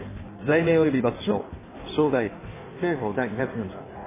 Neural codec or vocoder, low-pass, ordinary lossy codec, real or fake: codec, 16 kHz in and 24 kHz out, 1.1 kbps, FireRedTTS-2 codec; 3.6 kHz; MP3, 16 kbps; fake